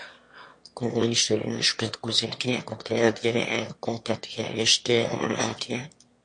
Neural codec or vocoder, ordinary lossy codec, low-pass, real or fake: autoencoder, 22.05 kHz, a latent of 192 numbers a frame, VITS, trained on one speaker; MP3, 48 kbps; 9.9 kHz; fake